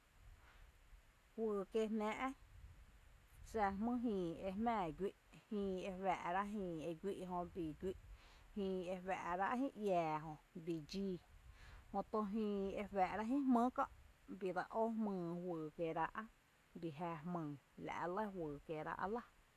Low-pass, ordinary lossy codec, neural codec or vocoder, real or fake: 14.4 kHz; none; none; real